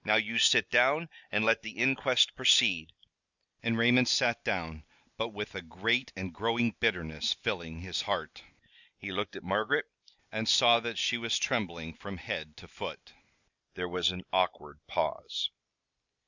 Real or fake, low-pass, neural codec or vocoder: real; 7.2 kHz; none